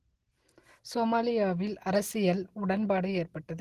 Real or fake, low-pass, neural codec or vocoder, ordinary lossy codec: real; 14.4 kHz; none; Opus, 16 kbps